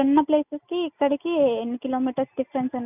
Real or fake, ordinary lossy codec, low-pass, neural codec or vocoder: real; none; 3.6 kHz; none